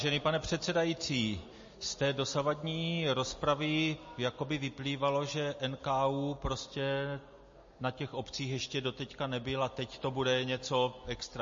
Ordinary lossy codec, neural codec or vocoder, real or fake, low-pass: MP3, 32 kbps; none; real; 7.2 kHz